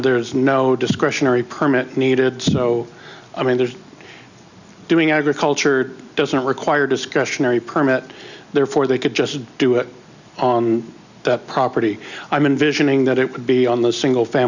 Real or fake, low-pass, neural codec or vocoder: real; 7.2 kHz; none